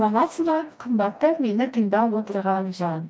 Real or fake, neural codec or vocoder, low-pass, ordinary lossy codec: fake; codec, 16 kHz, 1 kbps, FreqCodec, smaller model; none; none